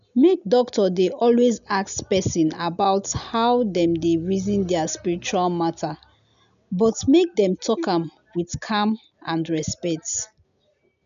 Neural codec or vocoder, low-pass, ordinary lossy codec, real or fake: none; 7.2 kHz; none; real